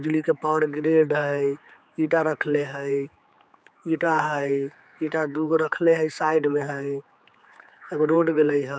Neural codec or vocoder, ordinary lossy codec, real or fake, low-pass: codec, 16 kHz, 4 kbps, X-Codec, HuBERT features, trained on general audio; none; fake; none